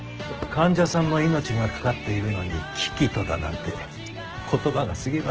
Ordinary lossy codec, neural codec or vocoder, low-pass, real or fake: Opus, 16 kbps; none; 7.2 kHz; real